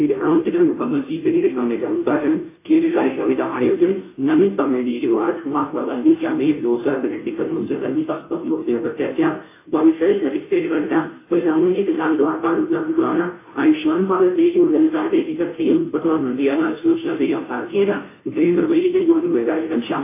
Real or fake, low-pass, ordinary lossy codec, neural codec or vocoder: fake; 3.6 kHz; AAC, 24 kbps; codec, 16 kHz, 0.5 kbps, FunCodec, trained on Chinese and English, 25 frames a second